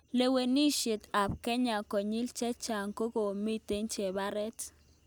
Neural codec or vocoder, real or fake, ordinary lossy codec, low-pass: none; real; none; none